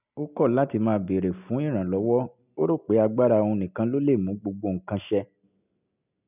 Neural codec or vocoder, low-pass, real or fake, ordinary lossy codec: none; 3.6 kHz; real; none